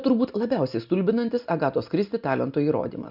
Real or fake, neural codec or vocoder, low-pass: real; none; 5.4 kHz